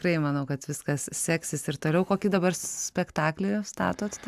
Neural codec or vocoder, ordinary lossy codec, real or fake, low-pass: none; AAC, 96 kbps; real; 14.4 kHz